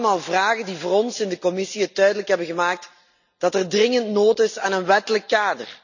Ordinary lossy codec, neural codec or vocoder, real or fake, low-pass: none; none; real; 7.2 kHz